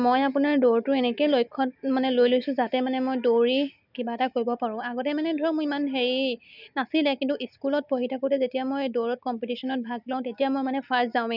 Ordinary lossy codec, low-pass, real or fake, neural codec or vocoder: none; 5.4 kHz; real; none